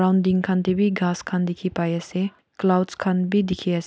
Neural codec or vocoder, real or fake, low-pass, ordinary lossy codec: none; real; none; none